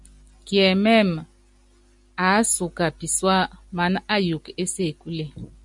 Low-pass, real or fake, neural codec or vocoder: 10.8 kHz; real; none